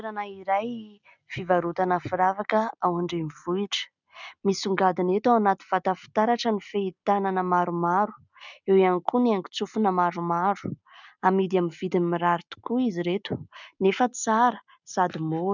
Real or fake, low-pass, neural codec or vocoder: real; 7.2 kHz; none